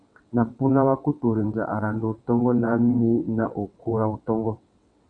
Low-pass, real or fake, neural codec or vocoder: 9.9 kHz; fake; vocoder, 22.05 kHz, 80 mel bands, WaveNeXt